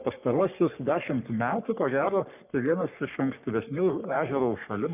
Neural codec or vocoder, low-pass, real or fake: codec, 44.1 kHz, 3.4 kbps, Pupu-Codec; 3.6 kHz; fake